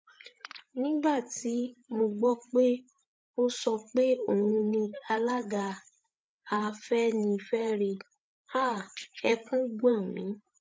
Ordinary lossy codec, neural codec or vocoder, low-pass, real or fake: none; codec, 16 kHz, 8 kbps, FreqCodec, larger model; none; fake